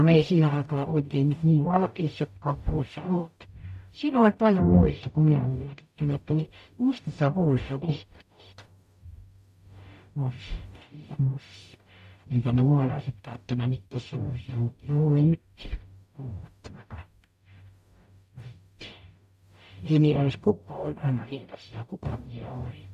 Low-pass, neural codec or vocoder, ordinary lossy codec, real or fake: 14.4 kHz; codec, 44.1 kHz, 0.9 kbps, DAC; MP3, 96 kbps; fake